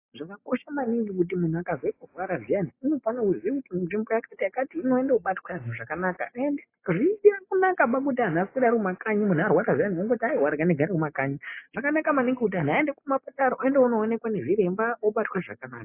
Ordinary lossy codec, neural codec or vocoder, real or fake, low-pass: AAC, 24 kbps; none; real; 3.6 kHz